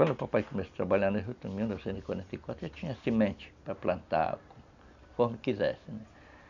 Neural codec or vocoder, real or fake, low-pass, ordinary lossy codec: none; real; 7.2 kHz; none